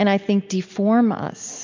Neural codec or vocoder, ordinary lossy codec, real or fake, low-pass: none; MP3, 64 kbps; real; 7.2 kHz